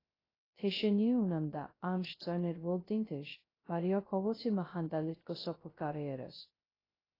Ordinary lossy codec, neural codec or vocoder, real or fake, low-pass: AAC, 24 kbps; codec, 16 kHz, 0.2 kbps, FocalCodec; fake; 5.4 kHz